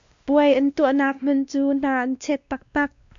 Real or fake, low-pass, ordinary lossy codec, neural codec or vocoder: fake; 7.2 kHz; AAC, 64 kbps; codec, 16 kHz, 1 kbps, X-Codec, WavLM features, trained on Multilingual LibriSpeech